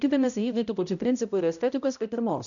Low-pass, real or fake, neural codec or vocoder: 7.2 kHz; fake; codec, 16 kHz, 0.5 kbps, X-Codec, HuBERT features, trained on balanced general audio